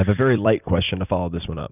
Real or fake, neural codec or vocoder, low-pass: fake; vocoder, 44.1 kHz, 128 mel bands every 256 samples, BigVGAN v2; 3.6 kHz